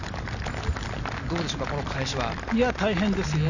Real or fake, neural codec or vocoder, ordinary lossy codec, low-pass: real; none; none; 7.2 kHz